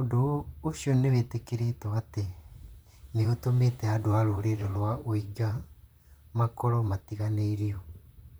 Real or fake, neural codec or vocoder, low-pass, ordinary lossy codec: fake; vocoder, 44.1 kHz, 128 mel bands, Pupu-Vocoder; none; none